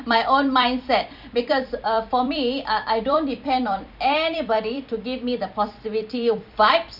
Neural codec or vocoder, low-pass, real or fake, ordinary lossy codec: vocoder, 44.1 kHz, 128 mel bands every 256 samples, BigVGAN v2; 5.4 kHz; fake; none